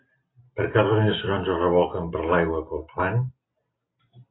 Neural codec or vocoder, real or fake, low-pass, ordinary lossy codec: none; real; 7.2 kHz; AAC, 16 kbps